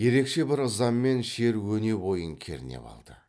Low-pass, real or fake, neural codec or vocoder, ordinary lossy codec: none; real; none; none